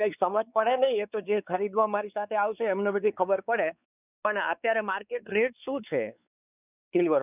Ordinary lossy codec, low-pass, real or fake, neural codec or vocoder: none; 3.6 kHz; fake; codec, 16 kHz, 4 kbps, X-Codec, WavLM features, trained on Multilingual LibriSpeech